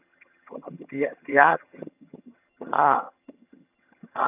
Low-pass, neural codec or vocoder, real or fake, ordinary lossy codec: 3.6 kHz; vocoder, 22.05 kHz, 80 mel bands, HiFi-GAN; fake; none